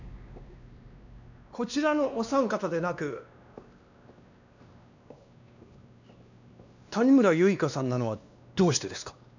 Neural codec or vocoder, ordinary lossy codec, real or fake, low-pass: codec, 16 kHz, 2 kbps, X-Codec, WavLM features, trained on Multilingual LibriSpeech; none; fake; 7.2 kHz